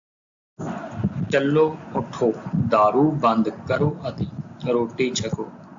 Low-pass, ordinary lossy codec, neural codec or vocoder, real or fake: 7.2 kHz; AAC, 64 kbps; none; real